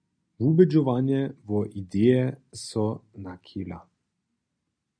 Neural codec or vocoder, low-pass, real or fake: none; 9.9 kHz; real